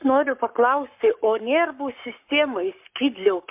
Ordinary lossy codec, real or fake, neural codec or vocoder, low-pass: MP3, 32 kbps; fake; codec, 44.1 kHz, 7.8 kbps, Pupu-Codec; 3.6 kHz